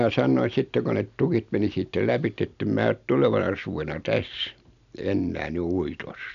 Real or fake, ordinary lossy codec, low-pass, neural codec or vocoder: real; none; 7.2 kHz; none